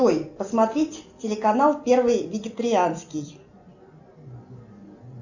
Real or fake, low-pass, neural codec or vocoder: real; 7.2 kHz; none